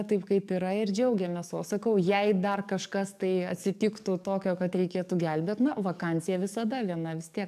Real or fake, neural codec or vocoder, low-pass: fake; codec, 44.1 kHz, 7.8 kbps, DAC; 14.4 kHz